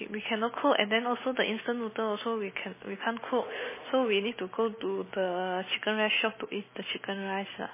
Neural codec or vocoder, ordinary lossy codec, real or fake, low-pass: none; MP3, 16 kbps; real; 3.6 kHz